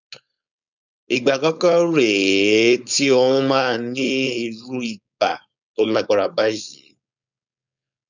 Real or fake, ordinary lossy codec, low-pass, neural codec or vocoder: fake; none; 7.2 kHz; codec, 16 kHz, 4.8 kbps, FACodec